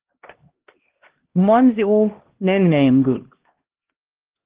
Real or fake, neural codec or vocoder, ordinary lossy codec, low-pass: fake; codec, 16 kHz, 1 kbps, X-Codec, HuBERT features, trained on LibriSpeech; Opus, 16 kbps; 3.6 kHz